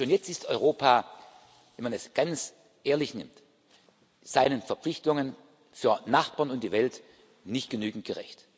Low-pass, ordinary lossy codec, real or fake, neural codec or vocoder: none; none; real; none